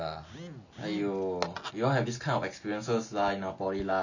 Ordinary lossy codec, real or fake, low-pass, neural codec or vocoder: none; real; 7.2 kHz; none